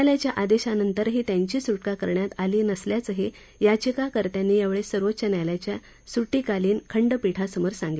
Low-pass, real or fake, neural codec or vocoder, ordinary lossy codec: none; real; none; none